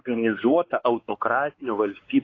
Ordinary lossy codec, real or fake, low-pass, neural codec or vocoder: AAC, 32 kbps; fake; 7.2 kHz; codec, 16 kHz, 4 kbps, X-Codec, WavLM features, trained on Multilingual LibriSpeech